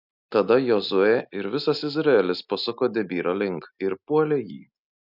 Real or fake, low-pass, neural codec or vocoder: real; 5.4 kHz; none